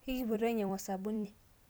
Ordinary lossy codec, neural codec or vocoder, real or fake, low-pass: none; vocoder, 44.1 kHz, 128 mel bands every 256 samples, BigVGAN v2; fake; none